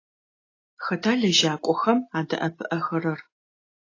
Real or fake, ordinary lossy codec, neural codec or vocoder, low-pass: real; AAC, 32 kbps; none; 7.2 kHz